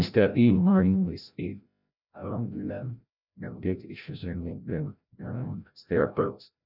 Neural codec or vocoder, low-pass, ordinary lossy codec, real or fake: codec, 16 kHz, 0.5 kbps, FreqCodec, larger model; 5.4 kHz; none; fake